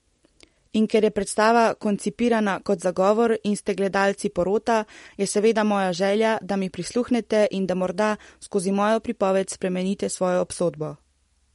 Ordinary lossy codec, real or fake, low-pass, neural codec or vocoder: MP3, 48 kbps; real; 19.8 kHz; none